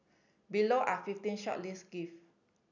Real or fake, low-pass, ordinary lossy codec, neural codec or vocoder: real; 7.2 kHz; none; none